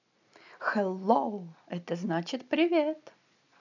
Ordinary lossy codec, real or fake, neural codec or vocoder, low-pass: none; real; none; 7.2 kHz